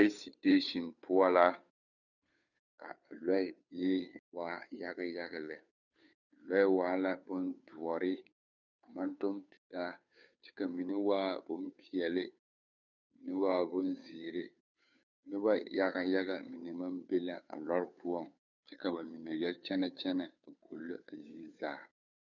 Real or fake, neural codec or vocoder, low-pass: fake; codec, 16 kHz, 2 kbps, FunCodec, trained on Chinese and English, 25 frames a second; 7.2 kHz